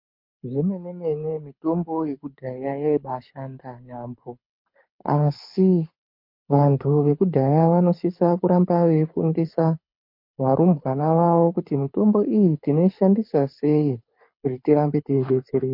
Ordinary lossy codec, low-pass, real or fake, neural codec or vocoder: MP3, 32 kbps; 5.4 kHz; fake; codec, 24 kHz, 6 kbps, HILCodec